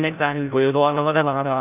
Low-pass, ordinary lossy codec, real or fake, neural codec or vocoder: 3.6 kHz; none; fake; codec, 16 kHz, 0.5 kbps, FreqCodec, larger model